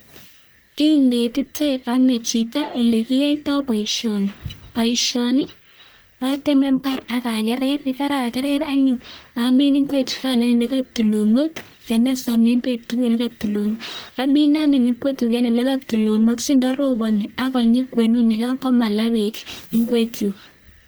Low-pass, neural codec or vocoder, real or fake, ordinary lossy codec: none; codec, 44.1 kHz, 1.7 kbps, Pupu-Codec; fake; none